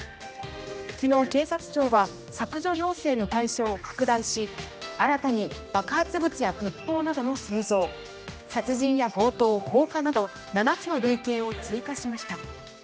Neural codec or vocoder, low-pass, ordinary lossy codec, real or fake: codec, 16 kHz, 1 kbps, X-Codec, HuBERT features, trained on general audio; none; none; fake